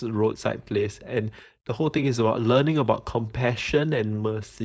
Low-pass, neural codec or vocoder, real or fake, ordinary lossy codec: none; codec, 16 kHz, 4.8 kbps, FACodec; fake; none